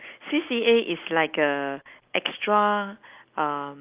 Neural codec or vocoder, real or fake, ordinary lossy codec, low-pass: none; real; Opus, 24 kbps; 3.6 kHz